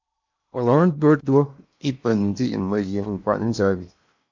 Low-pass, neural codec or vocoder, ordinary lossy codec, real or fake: 7.2 kHz; codec, 16 kHz in and 24 kHz out, 0.8 kbps, FocalCodec, streaming, 65536 codes; MP3, 64 kbps; fake